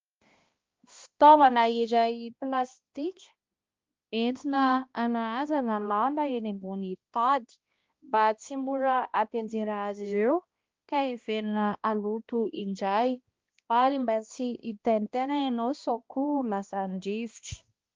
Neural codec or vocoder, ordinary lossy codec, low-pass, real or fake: codec, 16 kHz, 1 kbps, X-Codec, HuBERT features, trained on balanced general audio; Opus, 32 kbps; 7.2 kHz; fake